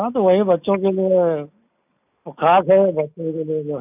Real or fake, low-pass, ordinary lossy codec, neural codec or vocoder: real; 3.6 kHz; none; none